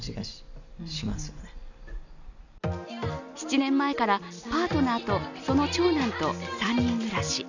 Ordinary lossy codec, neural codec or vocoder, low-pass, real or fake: none; none; 7.2 kHz; real